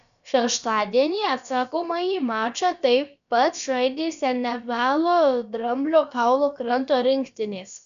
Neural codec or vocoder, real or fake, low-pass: codec, 16 kHz, about 1 kbps, DyCAST, with the encoder's durations; fake; 7.2 kHz